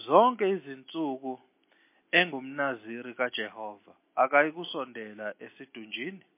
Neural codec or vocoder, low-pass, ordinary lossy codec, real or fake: none; 3.6 kHz; MP3, 24 kbps; real